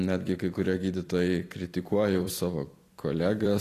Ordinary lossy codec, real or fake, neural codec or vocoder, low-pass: AAC, 48 kbps; fake; vocoder, 44.1 kHz, 128 mel bands every 256 samples, BigVGAN v2; 14.4 kHz